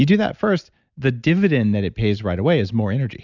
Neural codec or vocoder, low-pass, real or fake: none; 7.2 kHz; real